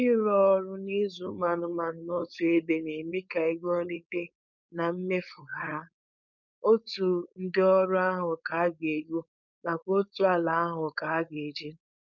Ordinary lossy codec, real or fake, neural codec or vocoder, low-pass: none; fake; codec, 16 kHz, 4.8 kbps, FACodec; 7.2 kHz